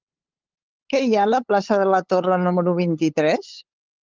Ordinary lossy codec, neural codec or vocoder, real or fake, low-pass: Opus, 32 kbps; codec, 16 kHz, 8 kbps, FunCodec, trained on LibriTTS, 25 frames a second; fake; 7.2 kHz